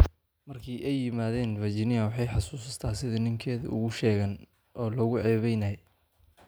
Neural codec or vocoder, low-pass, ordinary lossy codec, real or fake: none; none; none; real